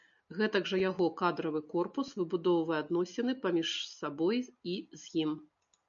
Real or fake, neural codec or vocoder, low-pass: real; none; 7.2 kHz